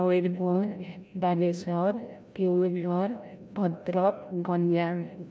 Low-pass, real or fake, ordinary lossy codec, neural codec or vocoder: none; fake; none; codec, 16 kHz, 0.5 kbps, FreqCodec, larger model